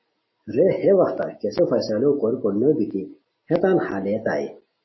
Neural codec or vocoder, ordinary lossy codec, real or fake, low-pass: none; MP3, 24 kbps; real; 7.2 kHz